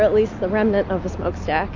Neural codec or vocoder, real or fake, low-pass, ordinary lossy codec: none; real; 7.2 kHz; AAC, 48 kbps